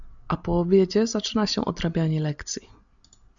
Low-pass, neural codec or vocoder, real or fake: 7.2 kHz; none; real